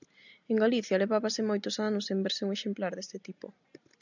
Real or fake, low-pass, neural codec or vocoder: real; 7.2 kHz; none